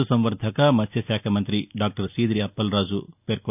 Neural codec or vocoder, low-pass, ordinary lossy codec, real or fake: none; 3.6 kHz; none; real